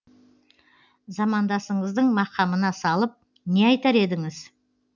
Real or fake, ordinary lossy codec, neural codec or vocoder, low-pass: real; none; none; 7.2 kHz